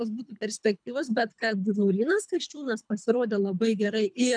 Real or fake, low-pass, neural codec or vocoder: fake; 9.9 kHz; codec, 24 kHz, 3 kbps, HILCodec